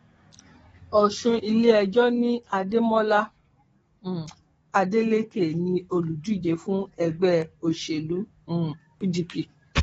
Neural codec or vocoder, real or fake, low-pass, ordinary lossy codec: codec, 44.1 kHz, 7.8 kbps, DAC; fake; 19.8 kHz; AAC, 24 kbps